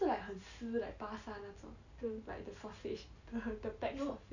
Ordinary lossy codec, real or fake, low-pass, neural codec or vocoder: none; real; 7.2 kHz; none